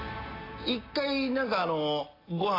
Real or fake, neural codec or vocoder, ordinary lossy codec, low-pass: real; none; AAC, 24 kbps; 5.4 kHz